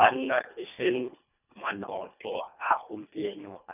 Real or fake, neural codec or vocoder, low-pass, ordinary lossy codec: fake; codec, 24 kHz, 1.5 kbps, HILCodec; 3.6 kHz; none